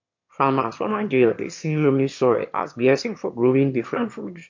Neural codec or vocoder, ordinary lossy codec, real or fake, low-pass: autoencoder, 22.05 kHz, a latent of 192 numbers a frame, VITS, trained on one speaker; MP3, 48 kbps; fake; 7.2 kHz